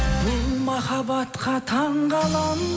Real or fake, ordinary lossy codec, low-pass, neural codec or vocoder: real; none; none; none